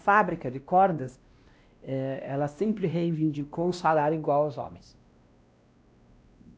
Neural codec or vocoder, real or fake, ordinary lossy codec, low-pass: codec, 16 kHz, 1 kbps, X-Codec, WavLM features, trained on Multilingual LibriSpeech; fake; none; none